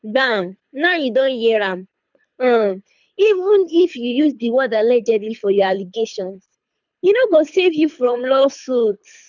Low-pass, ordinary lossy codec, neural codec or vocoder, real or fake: 7.2 kHz; none; codec, 24 kHz, 3 kbps, HILCodec; fake